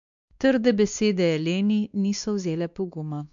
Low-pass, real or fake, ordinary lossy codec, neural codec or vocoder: 7.2 kHz; fake; none; codec, 16 kHz, 2 kbps, X-Codec, WavLM features, trained on Multilingual LibriSpeech